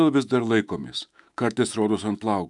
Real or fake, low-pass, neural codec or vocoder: fake; 10.8 kHz; autoencoder, 48 kHz, 128 numbers a frame, DAC-VAE, trained on Japanese speech